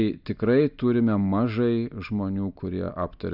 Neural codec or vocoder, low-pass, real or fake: none; 5.4 kHz; real